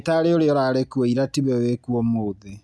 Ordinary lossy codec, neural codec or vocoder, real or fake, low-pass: none; none; real; none